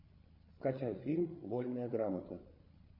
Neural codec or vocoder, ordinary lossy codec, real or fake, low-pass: codec, 16 kHz, 8 kbps, FreqCodec, larger model; MP3, 32 kbps; fake; 5.4 kHz